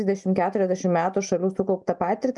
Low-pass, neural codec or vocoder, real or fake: 10.8 kHz; none; real